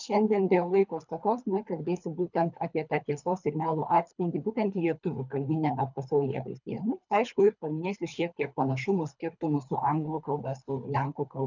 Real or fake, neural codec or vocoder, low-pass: fake; codec, 24 kHz, 3 kbps, HILCodec; 7.2 kHz